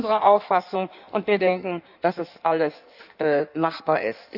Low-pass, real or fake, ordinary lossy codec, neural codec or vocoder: 5.4 kHz; fake; none; codec, 16 kHz in and 24 kHz out, 1.1 kbps, FireRedTTS-2 codec